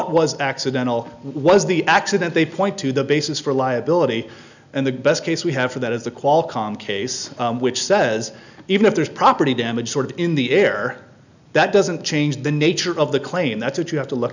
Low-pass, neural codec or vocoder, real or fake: 7.2 kHz; none; real